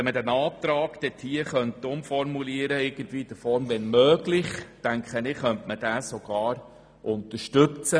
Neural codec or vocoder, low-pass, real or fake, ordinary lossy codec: none; none; real; none